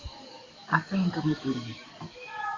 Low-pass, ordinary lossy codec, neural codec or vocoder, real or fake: 7.2 kHz; AAC, 32 kbps; codec, 44.1 kHz, 7.8 kbps, DAC; fake